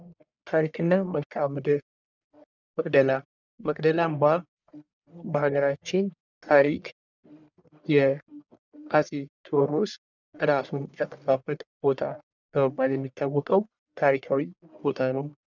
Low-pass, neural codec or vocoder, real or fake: 7.2 kHz; codec, 44.1 kHz, 1.7 kbps, Pupu-Codec; fake